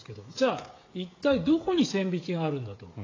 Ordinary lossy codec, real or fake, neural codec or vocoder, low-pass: AAC, 32 kbps; fake; codec, 16 kHz, 16 kbps, FreqCodec, smaller model; 7.2 kHz